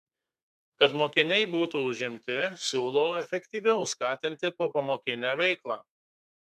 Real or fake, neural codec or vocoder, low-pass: fake; codec, 32 kHz, 1.9 kbps, SNAC; 14.4 kHz